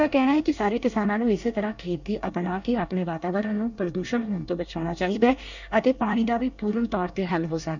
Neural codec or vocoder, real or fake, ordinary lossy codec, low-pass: codec, 24 kHz, 1 kbps, SNAC; fake; none; 7.2 kHz